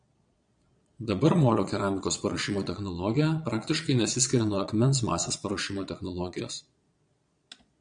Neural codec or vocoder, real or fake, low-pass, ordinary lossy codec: vocoder, 22.05 kHz, 80 mel bands, Vocos; fake; 9.9 kHz; MP3, 96 kbps